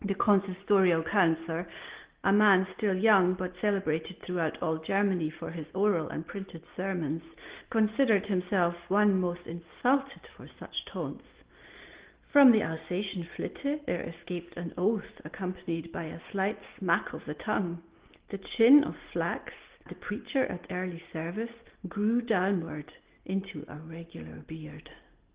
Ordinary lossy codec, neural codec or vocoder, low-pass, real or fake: Opus, 16 kbps; none; 3.6 kHz; real